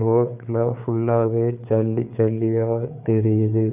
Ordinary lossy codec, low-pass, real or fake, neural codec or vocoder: none; 3.6 kHz; fake; codec, 16 kHz, 4 kbps, FreqCodec, larger model